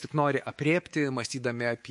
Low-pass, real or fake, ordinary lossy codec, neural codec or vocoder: 10.8 kHz; fake; MP3, 48 kbps; codec, 24 kHz, 3.1 kbps, DualCodec